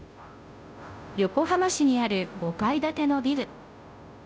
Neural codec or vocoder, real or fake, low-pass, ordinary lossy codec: codec, 16 kHz, 0.5 kbps, FunCodec, trained on Chinese and English, 25 frames a second; fake; none; none